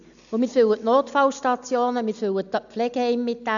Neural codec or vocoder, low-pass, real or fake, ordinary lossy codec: none; 7.2 kHz; real; none